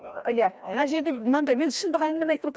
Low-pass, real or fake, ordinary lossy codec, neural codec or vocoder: none; fake; none; codec, 16 kHz, 1 kbps, FreqCodec, larger model